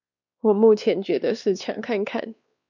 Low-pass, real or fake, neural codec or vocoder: 7.2 kHz; fake; codec, 16 kHz, 2 kbps, X-Codec, WavLM features, trained on Multilingual LibriSpeech